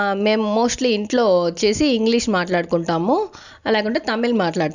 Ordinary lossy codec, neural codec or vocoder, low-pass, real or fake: none; none; 7.2 kHz; real